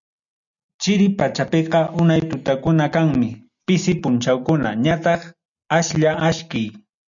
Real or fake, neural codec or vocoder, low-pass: real; none; 7.2 kHz